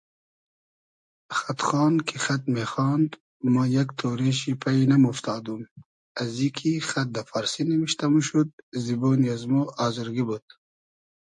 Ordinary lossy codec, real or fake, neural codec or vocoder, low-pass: MP3, 48 kbps; real; none; 9.9 kHz